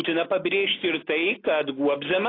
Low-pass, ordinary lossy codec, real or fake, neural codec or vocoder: 5.4 kHz; AAC, 24 kbps; real; none